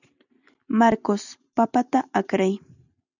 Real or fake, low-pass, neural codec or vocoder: real; 7.2 kHz; none